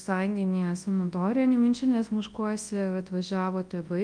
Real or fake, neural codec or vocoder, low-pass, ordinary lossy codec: fake; codec, 24 kHz, 0.9 kbps, WavTokenizer, large speech release; 9.9 kHz; Opus, 32 kbps